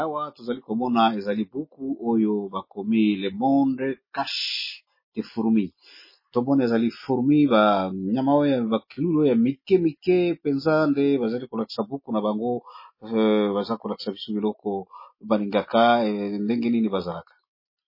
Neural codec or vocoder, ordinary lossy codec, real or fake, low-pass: none; MP3, 24 kbps; real; 5.4 kHz